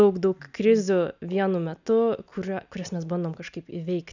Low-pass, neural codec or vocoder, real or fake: 7.2 kHz; none; real